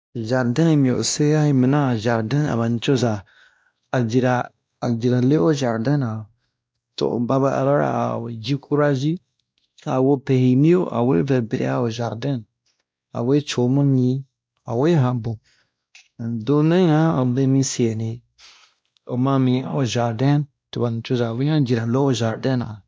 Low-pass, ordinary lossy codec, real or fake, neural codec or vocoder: none; none; fake; codec, 16 kHz, 1 kbps, X-Codec, WavLM features, trained on Multilingual LibriSpeech